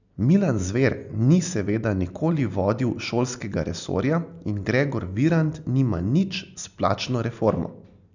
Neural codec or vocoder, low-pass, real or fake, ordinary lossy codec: none; 7.2 kHz; real; none